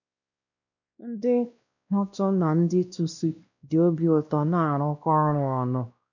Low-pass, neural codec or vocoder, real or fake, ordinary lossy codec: 7.2 kHz; codec, 16 kHz, 1 kbps, X-Codec, WavLM features, trained on Multilingual LibriSpeech; fake; none